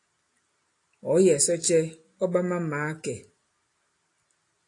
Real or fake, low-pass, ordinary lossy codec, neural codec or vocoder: real; 10.8 kHz; AAC, 48 kbps; none